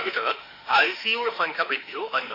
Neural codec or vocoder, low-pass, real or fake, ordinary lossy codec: autoencoder, 48 kHz, 32 numbers a frame, DAC-VAE, trained on Japanese speech; 5.4 kHz; fake; AAC, 32 kbps